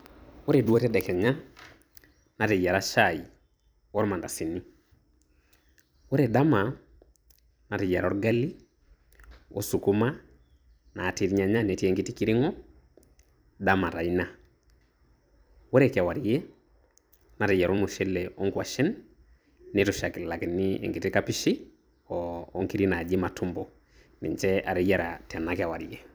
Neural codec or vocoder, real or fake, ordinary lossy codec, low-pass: vocoder, 44.1 kHz, 128 mel bands every 256 samples, BigVGAN v2; fake; none; none